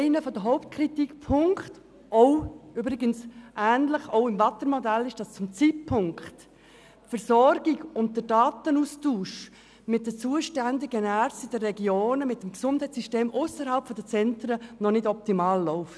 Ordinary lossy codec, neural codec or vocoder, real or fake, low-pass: none; none; real; none